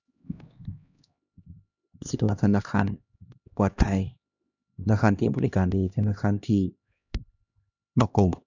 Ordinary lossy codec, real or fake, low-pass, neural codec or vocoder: none; fake; 7.2 kHz; codec, 16 kHz, 1 kbps, X-Codec, HuBERT features, trained on LibriSpeech